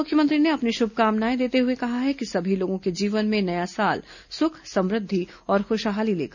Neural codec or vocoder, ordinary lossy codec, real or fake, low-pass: none; none; real; 7.2 kHz